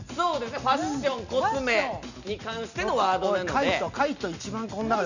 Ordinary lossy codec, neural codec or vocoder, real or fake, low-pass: none; none; real; 7.2 kHz